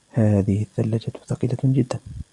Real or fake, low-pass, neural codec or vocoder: real; 10.8 kHz; none